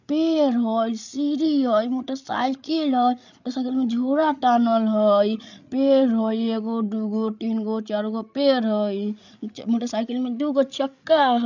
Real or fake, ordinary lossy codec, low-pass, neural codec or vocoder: fake; none; 7.2 kHz; codec, 16 kHz, 8 kbps, FreqCodec, larger model